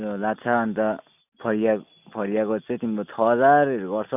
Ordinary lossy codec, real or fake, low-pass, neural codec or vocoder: none; real; 3.6 kHz; none